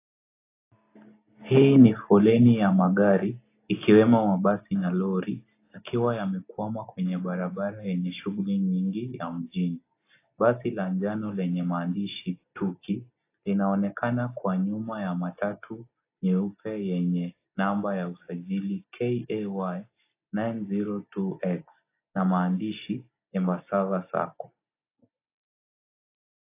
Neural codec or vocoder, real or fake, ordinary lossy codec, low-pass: none; real; AAC, 24 kbps; 3.6 kHz